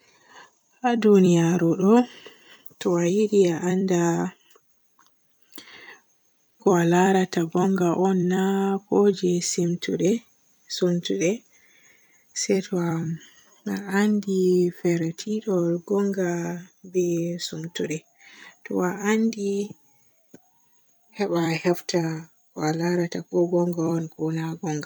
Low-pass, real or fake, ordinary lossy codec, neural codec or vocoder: none; fake; none; vocoder, 44.1 kHz, 128 mel bands every 256 samples, BigVGAN v2